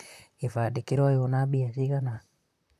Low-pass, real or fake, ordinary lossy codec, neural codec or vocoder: 14.4 kHz; fake; none; vocoder, 44.1 kHz, 128 mel bands, Pupu-Vocoder